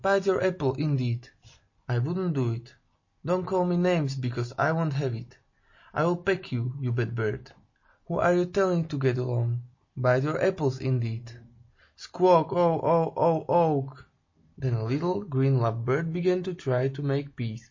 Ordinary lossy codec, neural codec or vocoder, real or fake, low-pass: MP3, 32 kbps; none; real; 7.2 kHz